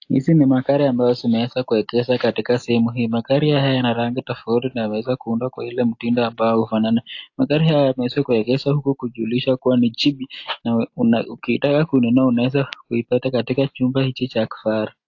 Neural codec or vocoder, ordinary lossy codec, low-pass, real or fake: none; AAC, 48 kbps; 7.2 kHz; real